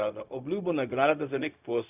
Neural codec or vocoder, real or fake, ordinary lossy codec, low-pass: codec, 16 kHz, 0.4 kbps, LongCat-Audio-Codec; fake; none; 3.6 kHz